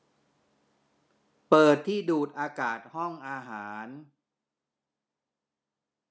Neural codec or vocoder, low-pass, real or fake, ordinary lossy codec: none; none; real; none